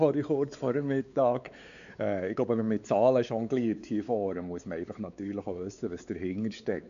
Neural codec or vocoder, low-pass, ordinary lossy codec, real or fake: codec, 16 kHz, 4 kbps, X-Codec, WavLM features, trained on Multilingual LibriSpeech; 7.2 kHz; none; fake